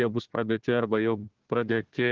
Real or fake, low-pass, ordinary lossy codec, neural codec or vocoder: fake; 7.2 kHz; Opus, 16 kbps; codec, 16 kHz, 1 kbps, FunCodec, trained on Chinese and English, 50 frames a second